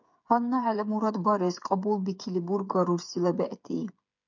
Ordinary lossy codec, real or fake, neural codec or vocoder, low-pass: MP3, 64 kbps; fake; codec, 16 kHz, 8 kbps, FreqCodec, smaller model; 7.2 kHz